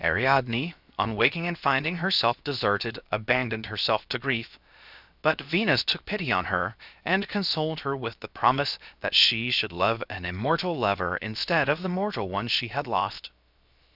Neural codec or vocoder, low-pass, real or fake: codec, 16 kHz, 0.7 kbps, FocalCodec; 5.4 kHz; fake